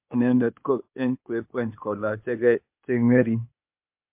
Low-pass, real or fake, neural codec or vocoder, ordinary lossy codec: 3.6 kHz; fake; codec, 16 kHz, 0.8 kbps, ZipCodec; MP3, 32 kbps